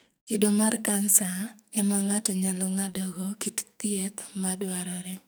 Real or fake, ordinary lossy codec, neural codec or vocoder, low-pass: fake; none; codec, 44.1 kHz, 2.6 kbps, SNAC; none